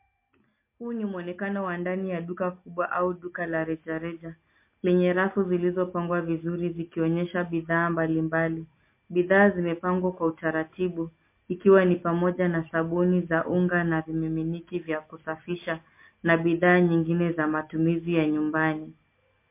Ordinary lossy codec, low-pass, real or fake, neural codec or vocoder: MP3, 24 kbps; 3.6 kHz; real; none